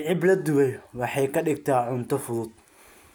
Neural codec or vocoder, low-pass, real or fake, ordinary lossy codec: none; none; real; none